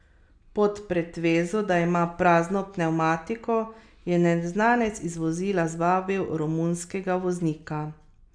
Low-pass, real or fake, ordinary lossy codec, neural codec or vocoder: 9.9 kHz; real; none; none